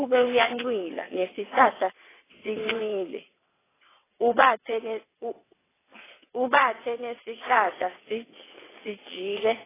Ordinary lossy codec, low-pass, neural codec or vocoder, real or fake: AAC, 16 kbps; 3.6 kHz; vocoder, 22.05 kHz, 80 mel bands, WaveNeXt; fake